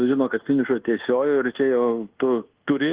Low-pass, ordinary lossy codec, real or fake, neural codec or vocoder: 3.6 kHz; Opus, 16 kbps; fake; codec, 24 kHz, 1.2 kbps, DualCodec